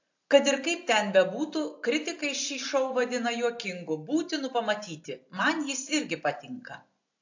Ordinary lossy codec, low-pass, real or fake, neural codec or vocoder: AAC, 48 kbps; 7.2 kHz; real; none